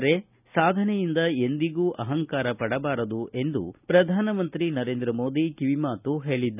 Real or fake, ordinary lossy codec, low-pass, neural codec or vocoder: real; none; 3.6 kHz; none